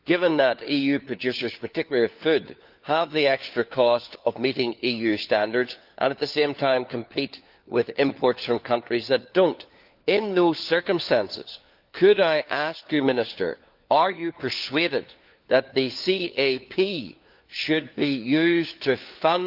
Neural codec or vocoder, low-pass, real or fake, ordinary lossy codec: codec, 16 kHz, 4 kbps, FunCodec, trained on LibriTTS, 50 frames a second; 5.4 kHz; fake; Opus, 24 kbps